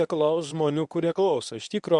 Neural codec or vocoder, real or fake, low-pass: codec, 24 kHz, 0.9 kbps, WavTokenizer, medium speech release version 1; fake; 10.8 kHz